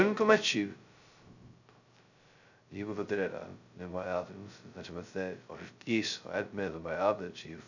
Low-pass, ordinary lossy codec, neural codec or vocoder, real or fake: 7.2 kHz; none; codec, 16 kHz, 0.2 kbps, FocalCodec; fake